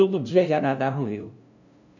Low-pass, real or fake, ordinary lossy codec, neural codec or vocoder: 7.2 kHz; fake; none; codec, 16 kHz, 0.5 kbps, FunCodec, trained on LibriTTS, 25 frames a second